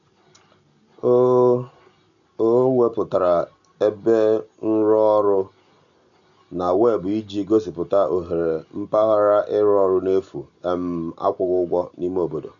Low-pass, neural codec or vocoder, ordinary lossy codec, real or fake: 7.2 kHz; none; none; real